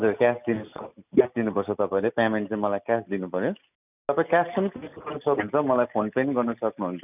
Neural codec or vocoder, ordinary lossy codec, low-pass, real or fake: none; none; 3.6 kHz; real